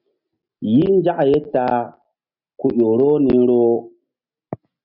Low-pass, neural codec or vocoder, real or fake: 5.4 kHz; none; real